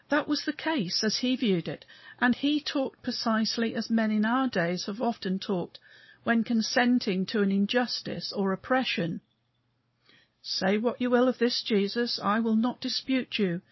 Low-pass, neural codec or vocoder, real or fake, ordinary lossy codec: 7.2 kHz; none; real; MP3, 24 kbps